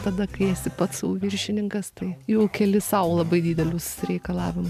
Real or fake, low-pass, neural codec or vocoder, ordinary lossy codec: real; 14.4 kHz; none; MP3, 96 kbps